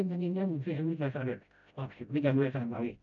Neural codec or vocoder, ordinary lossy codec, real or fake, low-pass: codec, 16 kHz, 0.5 kbps, FreqCodec, smaller model; none; fake; 7.2 kHz